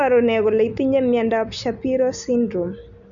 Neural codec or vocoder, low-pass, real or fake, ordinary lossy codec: none; 7.2 kHz; real; none